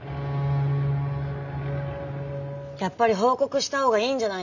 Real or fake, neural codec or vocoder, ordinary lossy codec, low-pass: real; none; none; 7.2 kHz